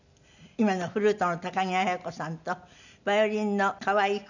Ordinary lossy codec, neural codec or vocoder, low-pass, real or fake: none; none; 7.2 kHz; real